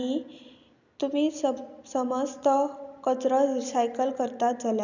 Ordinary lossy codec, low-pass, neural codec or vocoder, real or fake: none; 7.2 kHz; none; real